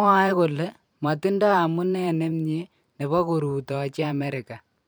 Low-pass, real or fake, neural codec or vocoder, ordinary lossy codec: none; fake; vocoder, 44.1 kHz, 128 mel bands every 512 samples, BigVGAN v2; none